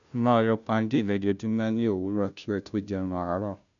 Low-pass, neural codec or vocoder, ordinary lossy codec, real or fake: 7.2 kHz; codec, 16 kHz, 0.5 kbps, FunCodec, trained on Chinese and English, 25 frames a second; none; fake